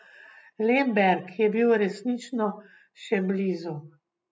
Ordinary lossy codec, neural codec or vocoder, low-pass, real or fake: none; none; none; real